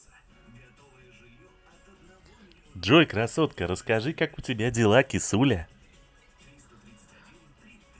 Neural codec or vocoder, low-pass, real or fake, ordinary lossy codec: none; none; real; none